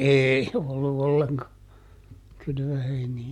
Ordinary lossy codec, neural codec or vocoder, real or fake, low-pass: MP3, 96 kbps; none; real; 19.8 kHz